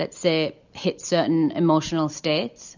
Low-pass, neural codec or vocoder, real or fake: 7.2 kHz; none; real